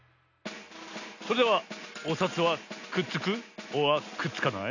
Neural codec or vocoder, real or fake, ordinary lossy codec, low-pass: none; real; none; 7.2 kHz